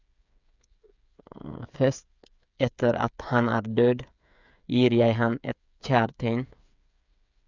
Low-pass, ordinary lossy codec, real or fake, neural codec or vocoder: 7.2 kHz; none; fake; codec, 16 kHz, 8 kbps, FreqCodec, smaller model